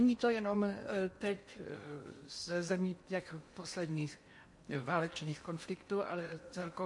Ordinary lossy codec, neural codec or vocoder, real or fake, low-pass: MP3, 48 kbps; codec, 16 kHz in and 24 kHz out, 0.8 kbps, FocalCodec, streaming, 65536 codes; fake; 10.8 kHz